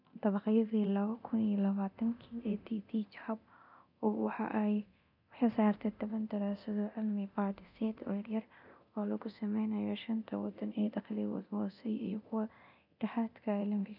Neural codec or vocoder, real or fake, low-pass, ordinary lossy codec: codec, 24 kHz, 0.9 kbps, DualCodec; fake; 5.4 kHz; none